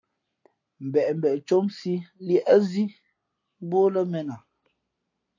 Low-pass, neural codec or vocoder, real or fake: 7.2 kHz; none; real